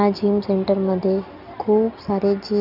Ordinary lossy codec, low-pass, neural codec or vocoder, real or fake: none; 5.4 kHz; none; real